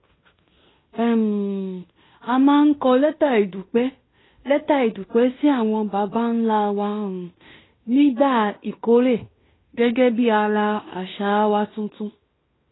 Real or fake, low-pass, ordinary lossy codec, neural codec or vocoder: fake; 7.2 kHz; AAC, 16 kbps; codec, 16 kHz in and 24 kHz out, 0.9 kbps, LongCat-Audio-Codec, fine tuned four codebook decoder